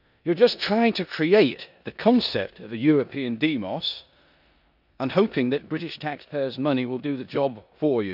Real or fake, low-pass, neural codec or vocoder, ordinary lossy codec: fake; 5.4 kHz; codec, 16 kHz in and 24 kHz out, 0.9 kbps, LongCat-Audio-Codec, four codebook decoder; none